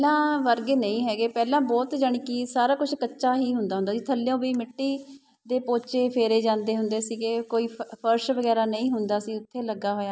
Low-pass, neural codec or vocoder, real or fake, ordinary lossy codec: none; none; real; none